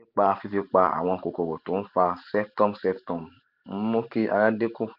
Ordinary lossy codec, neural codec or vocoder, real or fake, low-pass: none; vocoder, 44.1 kHz, 128 mel bands every 512 samples, BigVGAN v2; fake; 5.4 kHz